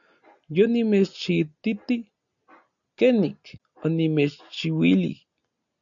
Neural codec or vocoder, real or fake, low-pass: none; real; 7.2 kHz